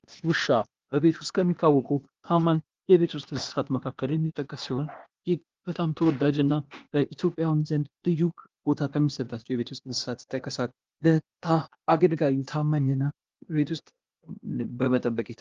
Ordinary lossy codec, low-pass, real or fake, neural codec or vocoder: Opus, 32 kbps; 7.2 kHz; fake; codec, 16 kHz, 0.8 kbps, ZipCodec